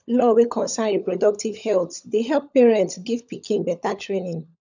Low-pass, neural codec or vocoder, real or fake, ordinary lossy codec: 7.2 kHz; codec, 16 kHz, 16 kbps, FunCodec, trained on LibriTTS, 50 frames a second; fake; none